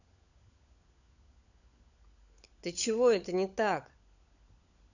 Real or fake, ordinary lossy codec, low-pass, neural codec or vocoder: fake; none; 7.2 kHz; codec, 16 kHz, 16 kbps, FunCodec, trained on LibriTTS, 50 frames a second